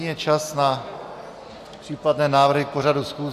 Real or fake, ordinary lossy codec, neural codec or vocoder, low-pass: real; Opus, 64 kbps; none; 14.4 kHz